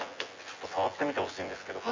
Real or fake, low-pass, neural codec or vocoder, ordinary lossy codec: fake; 7.2 kHz; vocoder, 24 kHz, 100 mel bands, Vocos; MP3, 64 kbps